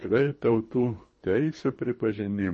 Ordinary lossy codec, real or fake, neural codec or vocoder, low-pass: MP3, 32 kbps; fake; codec, 24 kHz, 3 kbps, HILCodec; 10.8 kHz